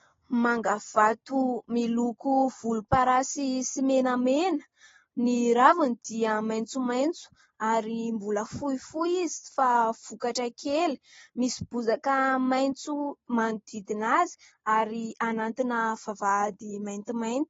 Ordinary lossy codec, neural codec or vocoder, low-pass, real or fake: AAC, 24 kbps; none; 7.2 kHz; real